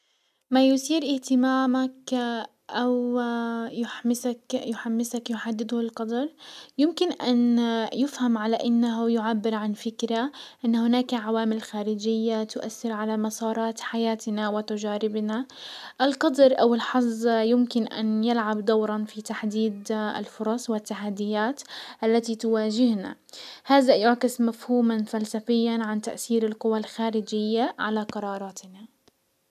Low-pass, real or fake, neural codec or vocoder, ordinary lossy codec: 14.4 kHz; real; none; none